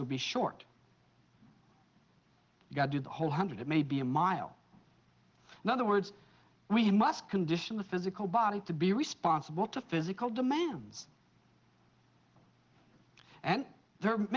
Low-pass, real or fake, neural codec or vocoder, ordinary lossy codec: 7.2 kHz; real; none; Opus, 16 kbps